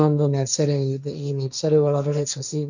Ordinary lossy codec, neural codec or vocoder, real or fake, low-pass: none; codec, 16 kHz, 1.1 kbps, Voila-Tokenizer; fake; 7.2 kHz